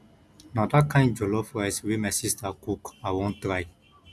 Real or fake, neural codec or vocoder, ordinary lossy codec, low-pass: real; none; none; none